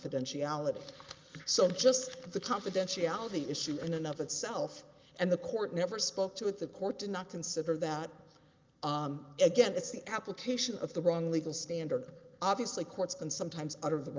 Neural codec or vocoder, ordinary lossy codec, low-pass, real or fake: none; Opus, 32 kbps; 7.2 kHz; real